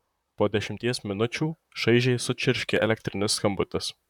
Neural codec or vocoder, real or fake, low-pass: vocoder, 44.1 kHz, 128 mel bands, Pupu-Vocoder; fake; 19.8 kHz